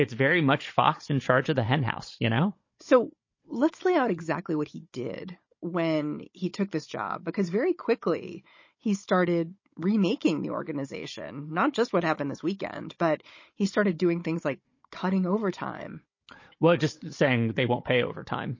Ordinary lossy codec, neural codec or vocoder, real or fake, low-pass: MP3, 32 kbps; codec, 16 kHz, 16 kbps, FunCodec, trained on Chinese and English, 50 frames a second; fake; 7.2 kHz